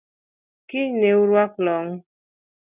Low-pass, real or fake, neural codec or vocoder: 3.6 kHz; real; none